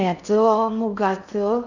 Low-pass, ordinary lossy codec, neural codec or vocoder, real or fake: 7.2 kHz; none; codec, 16 kHz in and 24 kHz out, 0.6 kbps, FocalCodec, streaming, 2048 codes; fake